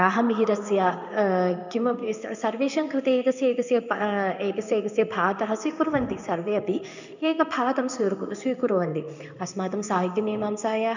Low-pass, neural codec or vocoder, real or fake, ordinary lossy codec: 7.2 kHz; codec, 16 kHz in and 24 kHz out, 1 kbps, XY-Tokenizer; fake; none